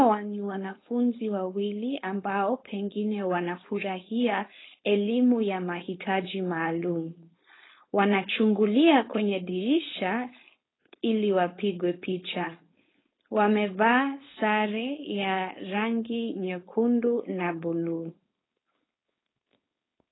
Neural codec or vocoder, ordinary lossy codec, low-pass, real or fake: codec, 16 kHz, 4.8 kbps, FACodec; AAC, 16 kbps; 7.2 kHz; fake